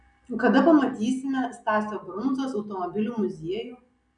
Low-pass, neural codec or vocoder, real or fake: 9.9 kHz; none; real